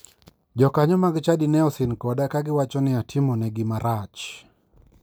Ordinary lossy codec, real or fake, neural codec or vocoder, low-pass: none; real; none; none